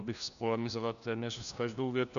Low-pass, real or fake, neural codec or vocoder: 7.2 kHz; fake; codec, 16 kHz, 1 kbps, FunCodec, trained on LibriTTS, 50 frames a second